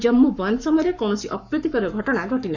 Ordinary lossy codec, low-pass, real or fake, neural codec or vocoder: none; 7.2 kHz; fake; codec, 44.1 kHz, 7.8 kbps, Pupu-Codec